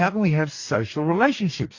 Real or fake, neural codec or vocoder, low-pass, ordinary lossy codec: fake; codec, 44.1 kHz, 2.6 kbps, DAC; 7.2 kHz; AAC, 48 kbps